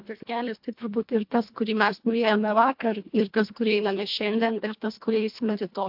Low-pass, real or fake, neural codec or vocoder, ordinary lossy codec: 5.4 kHz; fake; codec, 24 kHz, 1.5 kbps, HILCodec; MP3, 48 kbps